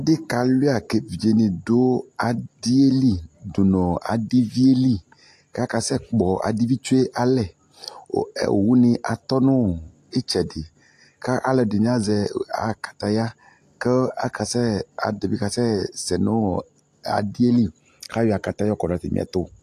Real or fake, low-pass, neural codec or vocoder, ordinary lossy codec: real; 14.4 kHz; none; AAC, 64 kbps